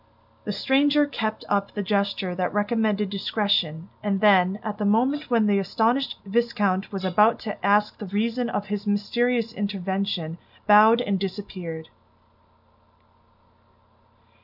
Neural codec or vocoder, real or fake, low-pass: none; real; 5.4 kHz